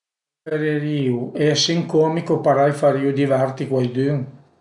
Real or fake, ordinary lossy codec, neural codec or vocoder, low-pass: real; none; none; 10.8 kHz